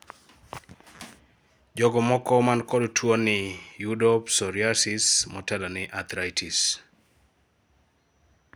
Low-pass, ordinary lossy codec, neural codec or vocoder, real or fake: none; none; none; real